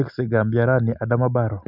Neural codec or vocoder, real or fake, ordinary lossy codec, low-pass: none; real; none; 5.4 kHz